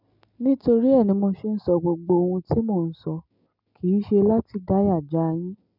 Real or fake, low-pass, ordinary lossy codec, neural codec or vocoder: real; 5.4 kHz; none; none